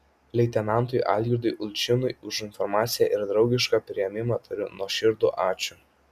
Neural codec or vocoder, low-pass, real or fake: none; 14.4 kHz; real